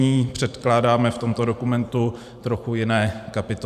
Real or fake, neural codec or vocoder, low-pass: fake; vocoder, 44.1 kHz, 128 mel bands every 512 samples, BigVGAN v2; 14.4 kHz